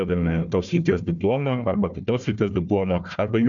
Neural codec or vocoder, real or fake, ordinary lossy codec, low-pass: codec, 16 kHz, 1 kbps, FunCodec, trained on Chinese and English, 50 frames a second; fake; MP3, 96 kbps; 7.2 kHz